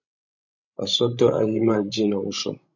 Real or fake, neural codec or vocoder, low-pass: fake; codec, 16 kHz, 16 kbps, FreqCodec, larger model; 7.2 kHz